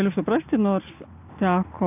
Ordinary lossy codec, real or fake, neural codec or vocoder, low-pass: AAC, 32 kbps; real; none; 3.6 kHz